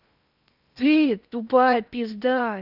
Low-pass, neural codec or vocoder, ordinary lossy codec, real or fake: 5.4 kHz; codec, 16 kHz in and 24 kHz out, 0.8 kbps, FocalCodec, streaming, 65536 codes; none; fake